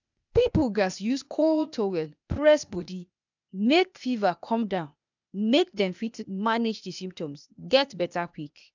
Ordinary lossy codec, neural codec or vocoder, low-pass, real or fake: none; codec, 16 kHz, 0.8 kbps, ZipCodec; 7.2 kHz; fake